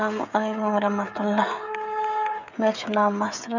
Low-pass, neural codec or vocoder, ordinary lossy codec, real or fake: 7.2 kHz; codec, 16 kHz, 16 kbps, FreqCodec, smaller model; none; fake